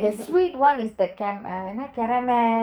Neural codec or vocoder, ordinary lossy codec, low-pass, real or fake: codec, 44.1 kHz, 2.6 kbps, SNAC; none; none; fake